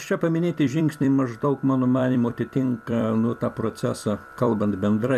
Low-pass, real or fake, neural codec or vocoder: 14.4 kHz; fake; vocoder, 44.1 kHz, 128 mel bands every 256 samples, BigVGAN v2